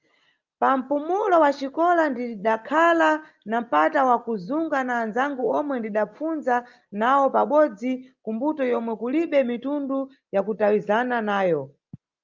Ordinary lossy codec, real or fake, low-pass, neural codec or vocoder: Opus, 32 kbps; real; 7.2 kHz; none